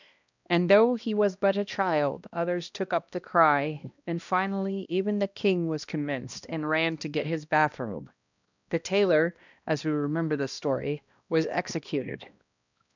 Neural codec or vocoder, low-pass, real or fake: codec, 16 kHz, 1 kbps, X-Codec, HuBERT features, trained on balanced general audio; 7.2 kHz; fake